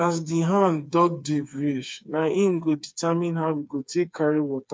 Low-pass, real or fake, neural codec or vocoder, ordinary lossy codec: none; fake; codec, 16 kHz, 4 kbps, FreqCodec, smaller model; none